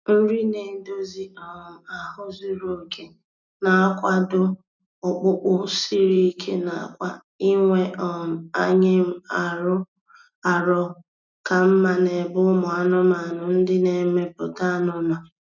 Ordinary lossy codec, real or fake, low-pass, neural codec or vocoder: none; real; 7.2 kHz; none